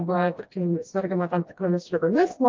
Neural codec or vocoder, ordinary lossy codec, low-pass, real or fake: codec, 16 kHz, 1 kbps, FreqCodec, smaller model; Opus, 32 kbps; 7.2 kHz; fake